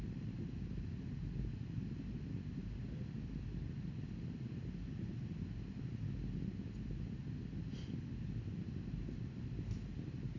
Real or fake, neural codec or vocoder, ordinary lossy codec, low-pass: real; none; MP3, 64 kbps; 7.2 kHz